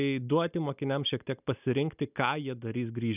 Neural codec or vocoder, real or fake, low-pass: none; real; 3.6 kHz